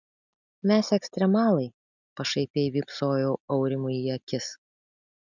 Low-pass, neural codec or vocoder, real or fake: 7.2 kHz; none; real